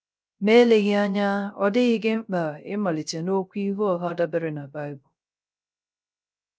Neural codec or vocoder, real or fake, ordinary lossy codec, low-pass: codec, 16 kHz, 0.3 kbps, FocalCodec; fake; none; none